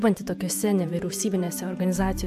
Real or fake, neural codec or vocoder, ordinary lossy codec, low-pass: fake; vocoder, 48 kHz, 128 mel bands, Vocos; AAC, 96 kbps; 14.4 kHz